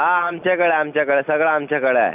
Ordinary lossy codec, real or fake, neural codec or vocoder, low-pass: none; real; none; 3.6 kHz